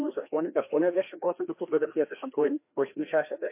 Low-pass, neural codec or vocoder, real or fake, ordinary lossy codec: 3.6 kHz; codec, 16 kHz, 1 kbps, FreqCodec, larger model; fake; MP3, 24 kbps